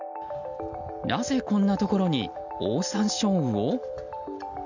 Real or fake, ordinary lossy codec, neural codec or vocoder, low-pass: real; none; none; 7.2 kHz